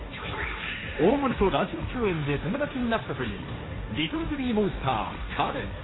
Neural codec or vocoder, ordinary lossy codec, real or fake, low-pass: codec, 16 kHz, 1.1 kbps, Voila-Tokenizer; AAC, 16 kbps; fake; 7.2 kHz